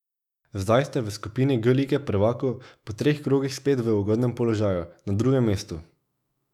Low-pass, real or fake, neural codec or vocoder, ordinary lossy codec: 19.8 kHz; fake; autoencoder, 48 kHz, 128 numbers a frame, DAC-VAE, trained on Japanese speech; none